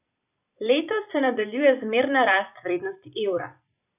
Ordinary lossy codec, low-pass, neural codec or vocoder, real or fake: none; 3.6 kHz; vocoder, 44.1 kHz, 128 mel bands every 256 samples, BigVGAN v2; fake